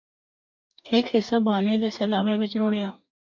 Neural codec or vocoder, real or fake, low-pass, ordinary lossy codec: codec, 44.1 kHz, 2.6 kbps, DAC; fake; 7.2 kHz; MP3, 48 kbps